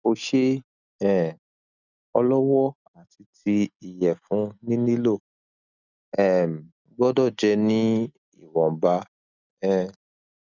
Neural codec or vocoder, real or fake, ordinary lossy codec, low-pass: none; real; none; 7.2 kHz